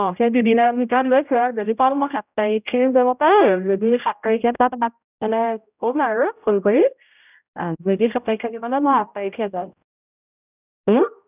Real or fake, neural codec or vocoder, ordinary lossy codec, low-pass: fake; codec, 16 kHz, 0.5 kbps, X-Codec, HuBERT features, trained on general audio; none; 3.6 kHz